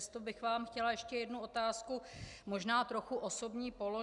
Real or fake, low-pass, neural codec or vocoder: real; 10.8 kHz; none